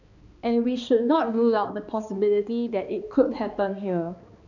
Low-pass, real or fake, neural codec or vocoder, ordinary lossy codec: 7.2 kHz; fake; codec, 16 kHz, 2 kbps, X-Codec, HuBERT features, trained on balanced general audio; none